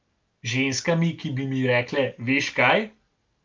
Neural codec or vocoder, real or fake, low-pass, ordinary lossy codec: none; real; 7.2 kHz; Opus, 24 kbps